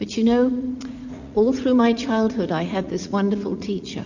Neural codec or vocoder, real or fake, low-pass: vocoder, 44.1 kHz, 80 mel bands, Vocos; fake; 7.2 kHz